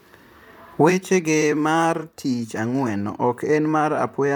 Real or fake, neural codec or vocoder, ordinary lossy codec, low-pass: fake; vocoder, 44.1 kHz, 128 mel bands, Pupu-Vocoder; none; none